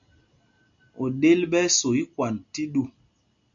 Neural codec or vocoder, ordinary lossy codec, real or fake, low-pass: none; MP3, 64 kbps; real; 7.2 kHz